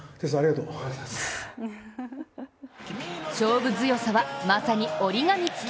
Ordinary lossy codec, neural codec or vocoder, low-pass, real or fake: none; none; none; real